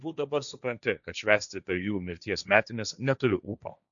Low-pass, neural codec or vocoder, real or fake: 7.2 kHz; codec, 16 kHz, 1.1 kbps, Voila-Tokenizer; fake